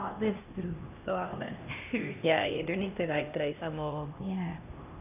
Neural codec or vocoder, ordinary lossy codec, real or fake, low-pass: codec, 16 kHz, 1 kbps, X-Codec, HuBERT features, trained on LibriSpeech; none; fake; 3.6 kHz